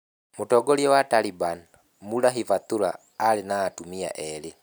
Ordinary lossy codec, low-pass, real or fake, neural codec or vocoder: none; none; real; none